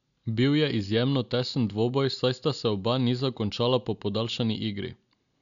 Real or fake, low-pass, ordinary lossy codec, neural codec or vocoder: real; 7.2 kHz; none; none